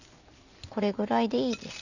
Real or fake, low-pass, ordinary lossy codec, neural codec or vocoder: real; 7.2 kHz; none; none